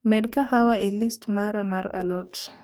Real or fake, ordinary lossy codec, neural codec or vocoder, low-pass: fake; none; codec, 44.1 kHz, 2.6 kbps, DAC; none